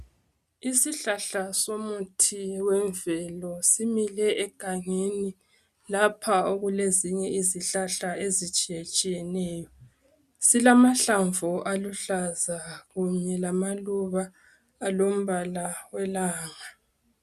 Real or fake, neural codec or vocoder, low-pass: real; none; 14.4 kHz